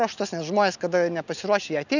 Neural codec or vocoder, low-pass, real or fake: none; 7.2 kHz; real